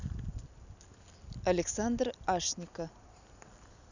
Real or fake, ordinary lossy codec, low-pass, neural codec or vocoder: real; none; 7.2 kHz; none